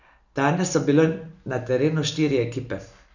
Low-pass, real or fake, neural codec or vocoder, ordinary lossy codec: 7.2 kHz; real; none; none